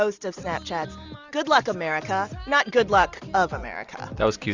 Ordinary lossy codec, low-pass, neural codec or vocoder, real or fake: Opus, 64 kbps; 7.2 kHz; none; real